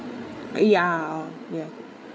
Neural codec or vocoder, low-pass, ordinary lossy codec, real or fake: codec, 16 kHz, 8 kbps, FreqCodec, larger model; none; none; fake